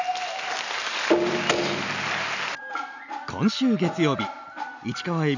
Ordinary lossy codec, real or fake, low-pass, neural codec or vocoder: none; real; 7.2 kHz; none